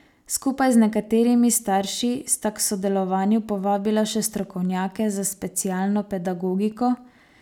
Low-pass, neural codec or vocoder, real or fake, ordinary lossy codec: 19.8 kHz; none; real; none